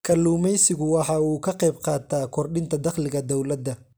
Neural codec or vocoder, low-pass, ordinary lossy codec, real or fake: none; none; none; real